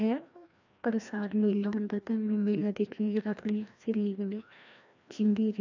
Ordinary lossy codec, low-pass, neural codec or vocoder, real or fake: none; 7.2 kHz; codec, 16 kHz, 1 kbps, FreqCodec, larger model; fake